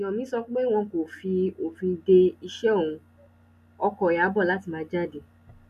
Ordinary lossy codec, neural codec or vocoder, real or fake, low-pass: none; none; real; 14.4 kHz